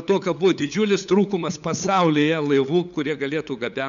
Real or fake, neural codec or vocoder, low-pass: fake; codec, 16 kHz, 8 kbps, FunCodec, trained on LibriTTS, 25 frames a second; 7.2 kHz